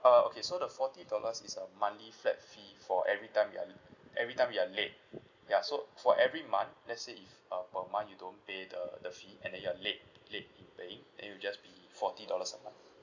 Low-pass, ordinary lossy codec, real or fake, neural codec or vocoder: 7.2 kHz; none; real; none